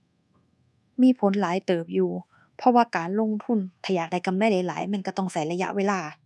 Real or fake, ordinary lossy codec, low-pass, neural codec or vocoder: fake; none; none; codec, 24 kHz, 1.2 kbps, DualCodec